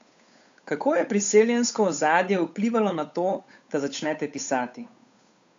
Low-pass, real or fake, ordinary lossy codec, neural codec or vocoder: 7.2 kHz; fake; AAC, 64 kbps; codec, 16 kHz, 8 kbps, FunCodec, trained on Chinese and English, 25 frames a second